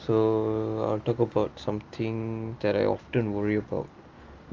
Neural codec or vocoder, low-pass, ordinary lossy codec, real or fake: none; 7.2 kHz; Opus, 32 kbps; real